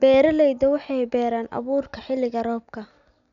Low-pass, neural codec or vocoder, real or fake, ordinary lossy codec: 7.2 kHz; none; real; none